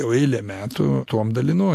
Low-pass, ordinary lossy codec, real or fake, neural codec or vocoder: 14.4 kHz; AAC, 64 kbps; real; none